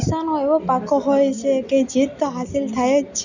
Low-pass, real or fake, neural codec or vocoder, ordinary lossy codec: 7.2 kHz; real; none; none